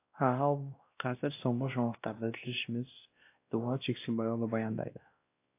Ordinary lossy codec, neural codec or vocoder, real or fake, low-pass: AAC, 24 kbps; codec, 16 kHz, 1 kbps, X-Codec, WavLM features, trained on Multilingual LibriSpeech; fake; 3.6 kHz